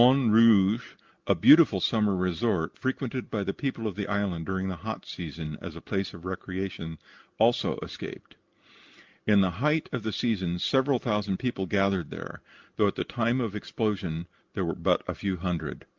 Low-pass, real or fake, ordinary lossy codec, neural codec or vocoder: 7.2 kHz; real; Opus, 32 kbps; none